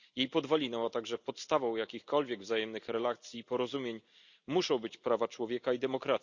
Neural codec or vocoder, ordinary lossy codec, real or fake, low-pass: none; none; real; 7.2 kHz